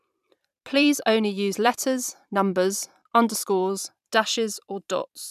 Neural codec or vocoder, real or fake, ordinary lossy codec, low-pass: vocoder, 44.1 kHz, 128 mel bands every 512 samples, BigVGAN v2; fake; none; 14.4 kHz